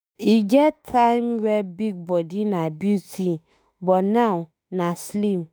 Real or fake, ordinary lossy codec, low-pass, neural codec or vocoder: fake; none; none; autoencoder, 48 kHz, 32 numbers a frame, DAC-VAE, trained on Japanese speech